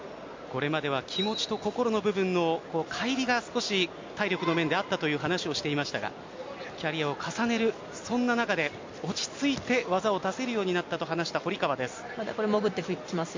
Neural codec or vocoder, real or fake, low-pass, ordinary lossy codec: none; real; 7.2 kHz; MP3, 48 kbps